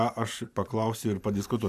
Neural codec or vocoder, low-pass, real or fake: vocoder, 44.1 kHz, 128 mel bands every 512 samples, BigVGAN v2; 14.4 kHz; fake